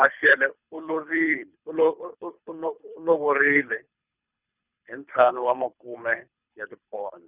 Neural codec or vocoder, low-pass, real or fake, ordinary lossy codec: codec, 24 kHz, 3 kbps, HILCodec; 3.6 kHz; fake; Opus, 64 kbps